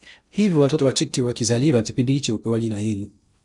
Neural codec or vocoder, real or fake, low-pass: codec, 16 kHz in and 24 kHz out, 0.6 kbps, FocalCodec, streaming, 4096 codes; fake; 10.8 kHz